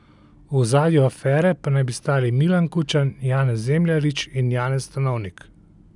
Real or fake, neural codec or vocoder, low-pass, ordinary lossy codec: real; none; 10.8 kHz; none